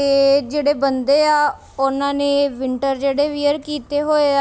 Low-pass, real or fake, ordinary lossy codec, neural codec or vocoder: none; real; none; none